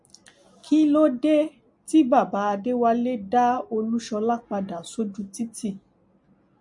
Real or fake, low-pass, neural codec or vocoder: real; 10.8 kHz; none